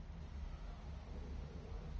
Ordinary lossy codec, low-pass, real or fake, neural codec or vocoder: Opus, 24 kbps; 7.2 kHz; real; none